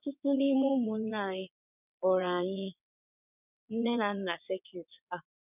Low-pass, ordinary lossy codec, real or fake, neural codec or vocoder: 3.6 kHz; none; fake; vocoder, 22.05 kHz, 80 mel bands, WaveNeXt